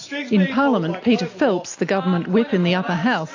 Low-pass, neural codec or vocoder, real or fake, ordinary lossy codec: 7.2 kHz; none; real; AAC, 48 kbps